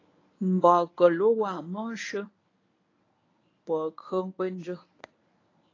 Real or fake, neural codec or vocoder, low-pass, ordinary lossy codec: fake; codec, 24 kHz, 0.9 kbps, WavTokenizer, medium speech release version 1; 7.2 kHz; AAC, 32 kbps